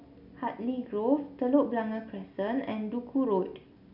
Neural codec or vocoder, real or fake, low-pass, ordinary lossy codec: none; real; 5.4 kHz; none